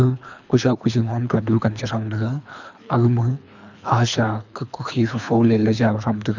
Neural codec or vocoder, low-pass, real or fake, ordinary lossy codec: codec, 24 kHz, 3 kbps, HILCodec; 7.2 kHz; fake; none